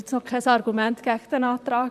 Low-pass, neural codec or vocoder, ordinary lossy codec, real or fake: 14.4 kHz; vocoder, 44.1 kHz, 128 mel bands, Pupu-Vocoder; none; fake